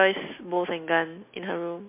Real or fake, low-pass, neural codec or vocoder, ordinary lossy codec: real; 3.6 kHz; none; MP3, 24 kbps